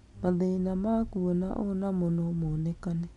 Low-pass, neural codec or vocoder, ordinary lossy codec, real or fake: 10.8 kHz; none; none; real